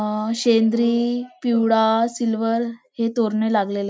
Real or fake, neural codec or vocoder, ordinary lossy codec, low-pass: real; none; none; none